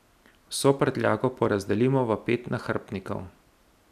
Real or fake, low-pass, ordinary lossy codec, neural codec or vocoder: real; 14.4 kHz; none; none